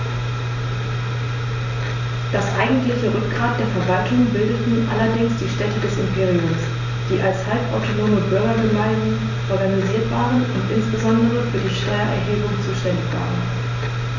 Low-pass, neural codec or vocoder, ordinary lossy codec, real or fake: 7.2 kHz; none; none; real